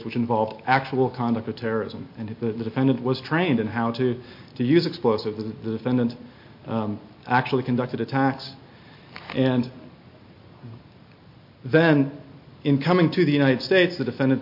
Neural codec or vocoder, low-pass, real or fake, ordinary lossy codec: none; 5.4 kHz; real; MP3, 32 kbps